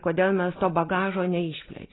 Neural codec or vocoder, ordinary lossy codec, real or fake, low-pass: none; AAC, 16 kbps; real; 7.2 kHz